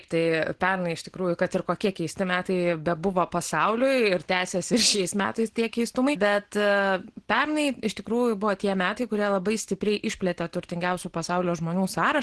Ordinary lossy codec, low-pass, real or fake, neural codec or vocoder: Opus, 16 kbps; 10.8 kHz; real; none